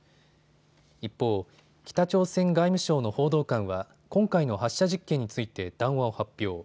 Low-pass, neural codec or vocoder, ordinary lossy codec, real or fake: none; none; none; real